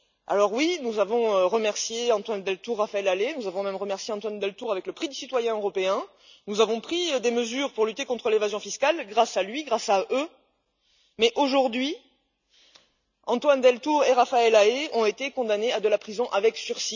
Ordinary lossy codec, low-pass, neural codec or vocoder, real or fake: none; 7.2 kHz; none; real